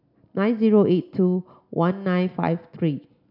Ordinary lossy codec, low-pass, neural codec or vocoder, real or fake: none; 5.4 kHz; none; real